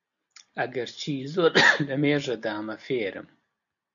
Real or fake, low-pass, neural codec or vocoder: real; 7.2 kHz; none